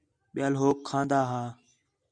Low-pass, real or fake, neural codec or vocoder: 9.9 kHz; real; none